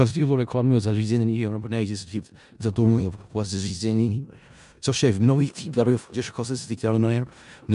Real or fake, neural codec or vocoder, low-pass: fake; codec, 16 kHz in and 24 kHz out, 0.4 kbps, LongCat-Audio-Codec, four codebook decoder; 10.8 kHz